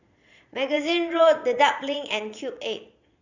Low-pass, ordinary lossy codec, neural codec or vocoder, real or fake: 7.2 kHz; none; vocoder, 22.05 kHz, 80 mel bands, Vocos; fake